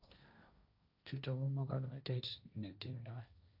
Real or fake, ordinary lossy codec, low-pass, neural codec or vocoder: fake; none; 5.4 kHz; codec, 16 kHz, 1.1 kbps, Voila-Tokenizer